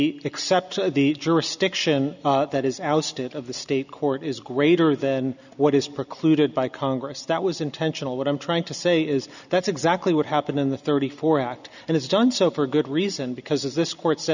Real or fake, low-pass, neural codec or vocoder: real; 7.2 kHz; none